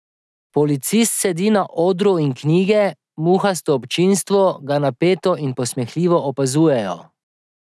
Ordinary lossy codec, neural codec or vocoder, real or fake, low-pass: none; none; real; none